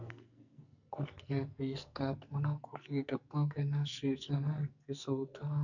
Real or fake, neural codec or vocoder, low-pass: fake; codec, 44.1 kHz, 2.6 kbps, SNAC; 7.2 kHz